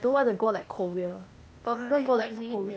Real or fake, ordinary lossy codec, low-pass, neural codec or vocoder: fake; none; none; codec, 16 kHz, 0.8 kbps, ZipCodec